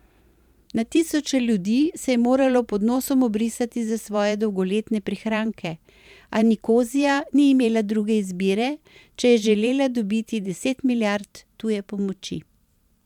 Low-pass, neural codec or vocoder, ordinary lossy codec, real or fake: 19.8 kHz; vocoder, 44.1 kHz, 128 mel bands every 512 samples, BigVGAN v2; none; fake